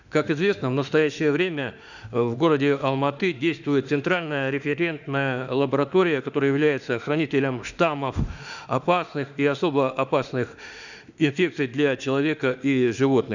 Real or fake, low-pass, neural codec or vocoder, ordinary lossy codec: fake; 7.2 kHz; autoencoder, 48 kHz, 32 numbers a frame, DAC-VAE, trained on Japanese speech; none